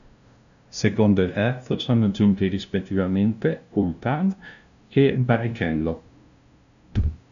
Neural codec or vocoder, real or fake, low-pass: codec, 16 kHz, 0.5 kbps, FunCodec, trained on LibriTTS, 25 frames a second; fake; 7.2 kHz